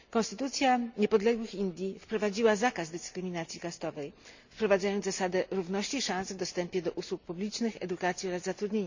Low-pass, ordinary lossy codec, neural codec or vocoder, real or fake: 7.2 kHz; Opus, 64 kbps; none; real